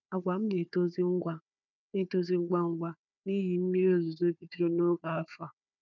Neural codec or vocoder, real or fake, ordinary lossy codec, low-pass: codec, 16 kHz, 4 kbps, FunCodec, trained on Chinese and English, 50 frames a second; fake; none; 7.2 kHz